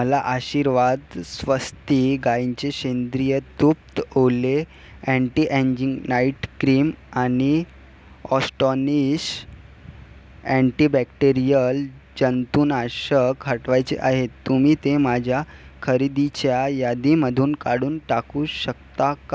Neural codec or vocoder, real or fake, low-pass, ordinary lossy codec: none; real; none; none